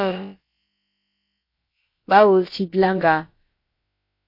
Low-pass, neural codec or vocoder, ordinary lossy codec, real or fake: 5.4 kHz; codec, 16 kHz, about 1 kbps, DyCAST, with the encoder's durations; MP3, 32 kbps; fake